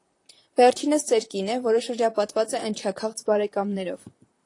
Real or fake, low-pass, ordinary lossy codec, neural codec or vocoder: fake; 10.8 kHz; AAC, 48 kbps; vocoder, 44.1 kHz, 128 mel bands, Pupu-Vocoder